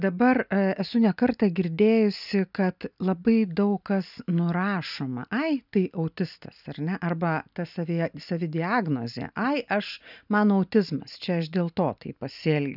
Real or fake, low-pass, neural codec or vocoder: real; 5.4 kHz; none